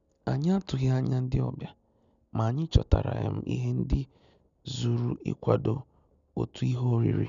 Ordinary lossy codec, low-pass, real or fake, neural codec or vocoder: none; 7.2 kHz; real; none